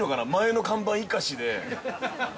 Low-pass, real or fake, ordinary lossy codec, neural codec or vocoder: none; real; none; none